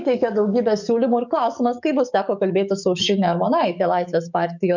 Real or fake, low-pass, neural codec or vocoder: fake; 7.2 kHz; codec, 44.1 kHz, 7.8 kbps, DAC